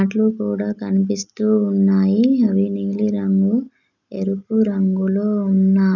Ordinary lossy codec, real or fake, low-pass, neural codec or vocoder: none; real; 7.2 kHz; none